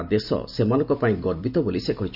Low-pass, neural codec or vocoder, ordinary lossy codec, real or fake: 5.4 kHz; none; none; real